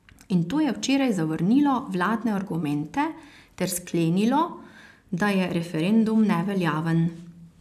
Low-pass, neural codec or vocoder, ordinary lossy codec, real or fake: 14.4 kHz; none; AAC, 96 kbps; real